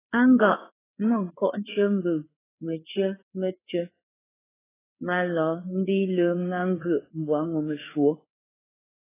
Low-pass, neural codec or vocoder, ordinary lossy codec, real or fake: 3.6 kHz; codec, 16 kHz, 4 kbps, FreqCodec, larger model; AAC, 16 kbps; fake